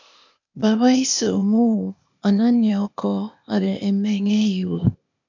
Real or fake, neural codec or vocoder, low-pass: fake; codec, 16 kHz, 0.8 kbps, ZipCodec; 7.2 kHz